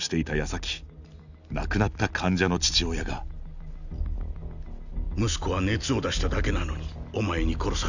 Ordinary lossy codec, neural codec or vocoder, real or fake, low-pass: none; none; real; 7.2 kHz